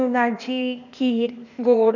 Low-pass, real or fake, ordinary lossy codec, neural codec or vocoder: 7.2 kHz; fake; none; codec, 16 kHz, 0.8 kbps, ZipCodec